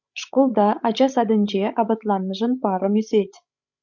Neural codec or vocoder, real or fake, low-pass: codec, 16 kHz, 16 kbps, FreqCodec, larger model; fake; 7.2 kHz